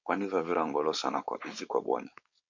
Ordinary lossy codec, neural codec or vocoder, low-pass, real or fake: MP3, 48 kbps; codec, 16 kHz, 4.8 kbps, FACodec; 7.2 kHz; fake